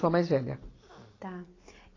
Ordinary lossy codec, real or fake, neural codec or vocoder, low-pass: AAC, 32 kbps; real; none; 7.2 kHz